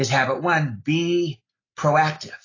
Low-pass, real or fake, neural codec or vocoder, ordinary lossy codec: 7.2 kHz; real; none; AAC, 32 kbps